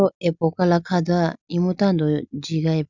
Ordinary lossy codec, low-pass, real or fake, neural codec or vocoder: none; 7.2 kHz; real; none